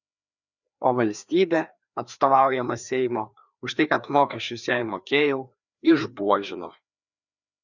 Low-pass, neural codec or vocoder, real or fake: 7.2 kHz; codec, 16 kHz, 2 kbps, FreqCodec, larger model; fake